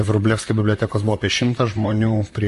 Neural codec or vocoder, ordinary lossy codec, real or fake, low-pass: vocoder, 44.1 kHz, 128 mel bands, Pupu-Vocoder; MP3, 48 kbps; fake; 14.4 kHz